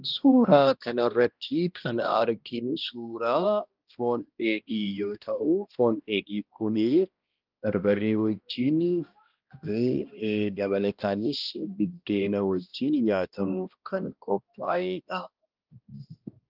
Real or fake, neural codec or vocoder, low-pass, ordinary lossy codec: fake; codec, 16 kHz, 1 kbps, X-Codec, HuBERT features, trained on balanced general audio; 5.4 kHz; Opus, 24 kbps